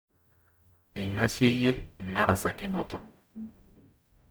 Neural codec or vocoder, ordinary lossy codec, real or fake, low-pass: codec, 44.1 kHz, 0.9 kbps, DAC; none; fake; none